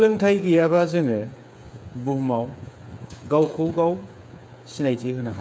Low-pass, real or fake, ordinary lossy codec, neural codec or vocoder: none; fake; none; codec, 16 kHz, 8 kbps, FreqCodec, smaller model